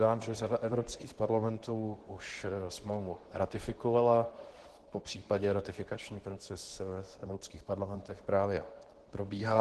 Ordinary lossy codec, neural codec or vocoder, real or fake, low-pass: Opus, 16 kbps; codec, 24 kHz, 0.9 kbps, WavTokenizer, medium speech release version 2; fake; 10.8 kHz